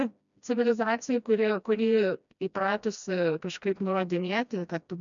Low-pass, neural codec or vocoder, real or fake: 7.2 kHz; codec, 16 kHz, 1 kbps, FreqCodec, smaller model; fake